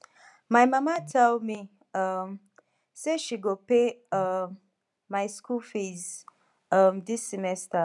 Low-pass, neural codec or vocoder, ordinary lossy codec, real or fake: 10.8 kHz; none; MP3, 96 kbps; real